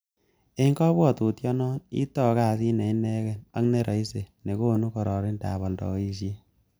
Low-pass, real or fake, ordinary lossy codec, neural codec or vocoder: none; real; none; none